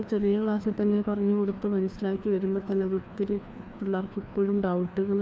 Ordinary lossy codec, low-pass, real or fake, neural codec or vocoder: none; none; fake; codec, 16 kHz, 2 kbps, FreqCodec, larger model